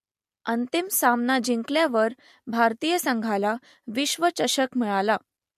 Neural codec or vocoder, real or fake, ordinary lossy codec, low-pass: none; real; MP3, 64 kbps; 14.4 kHz